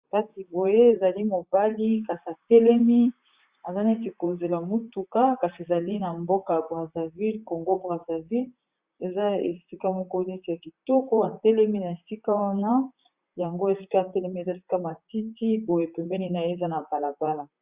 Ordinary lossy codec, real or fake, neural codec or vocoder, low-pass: Opus, 64 kbps; fake; vocoder, 44.1 kHz, 128 mel bands, Pupu-Vocoder; 3.6 kHz